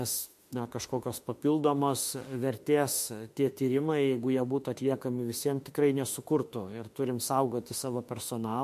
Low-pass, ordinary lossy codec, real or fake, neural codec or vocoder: 14.4 kHz; MP3, 64 kbps; fake; autoencoder, 48 kHz, 32 numbers a frame, DAC-VAE, trained on Japanese speech